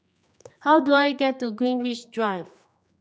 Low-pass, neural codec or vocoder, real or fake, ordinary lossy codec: none; codec, 16 kHz, 2 kbps, X-Codec, HuBERT features, trained on general audio; fake; none